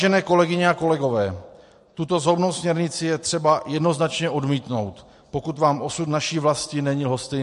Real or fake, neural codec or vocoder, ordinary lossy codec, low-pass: real; none; MP3, 48 kbps; 14.4 kHz